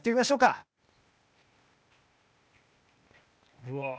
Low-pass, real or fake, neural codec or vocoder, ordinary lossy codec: none; fake; codec, 16 kHz, 0.8 kbps, ZipCodec; none